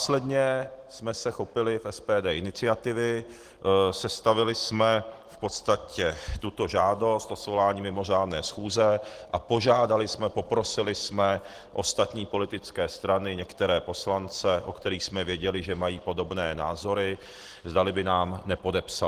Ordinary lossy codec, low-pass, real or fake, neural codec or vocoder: Opus, 16 kbps; 14.4 kHz; real; none